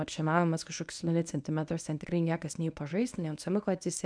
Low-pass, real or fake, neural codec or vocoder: 9.9 kHz; fake; codec, 24 kHz, 0.9 kbps, WavTokenizer, medium speech release version 1